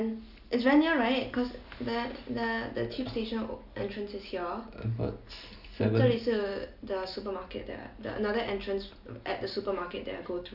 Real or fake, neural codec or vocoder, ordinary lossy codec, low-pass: real; none; none; 5.4 kHz